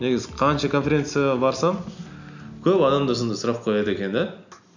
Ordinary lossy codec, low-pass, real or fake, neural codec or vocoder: none; 7.2 kHz; real; none